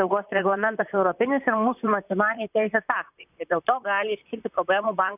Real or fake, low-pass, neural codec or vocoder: fake; 3.6 kHz; vocoder, 44.1 kHz, 128 mel bands, Pupu-Vocoder